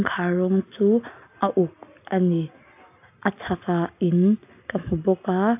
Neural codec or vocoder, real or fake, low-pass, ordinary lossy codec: none; real; 3.6 kHz; AAC, 32 kbps